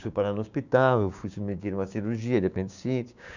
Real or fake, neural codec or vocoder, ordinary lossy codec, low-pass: fake; codec, 16 kHz, 6 kbps, DAC; none; 7.2 kHz